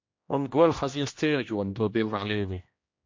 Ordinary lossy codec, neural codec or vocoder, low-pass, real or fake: MP3, 48 kbps; codec, 16 kHz, 1 kbps, X-Codec, HuBERT features, trained on general audio; 7.2 kHz; fake